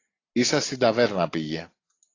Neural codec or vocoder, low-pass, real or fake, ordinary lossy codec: none; 7.2 kHz; real; AAC, 32 kbps